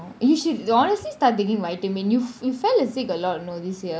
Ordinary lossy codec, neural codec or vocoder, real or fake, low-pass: none; none; real; none